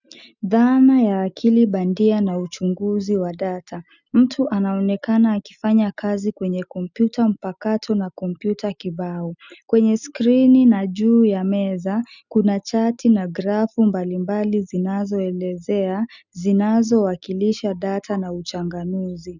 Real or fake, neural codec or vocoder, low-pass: real; none; 7.2 kHz